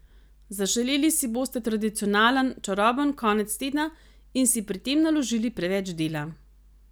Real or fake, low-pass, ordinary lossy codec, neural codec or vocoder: real; none; none; none